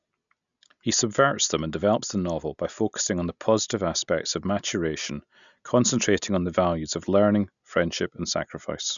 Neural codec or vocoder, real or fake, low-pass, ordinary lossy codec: none; real; 7.2 kHz; none